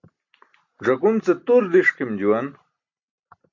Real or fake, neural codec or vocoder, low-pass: real; none; 7.2 kHz